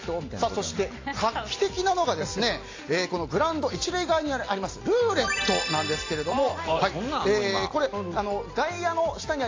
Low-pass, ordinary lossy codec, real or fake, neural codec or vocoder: 7.2 kHz; AAC, 32 kbps; real; none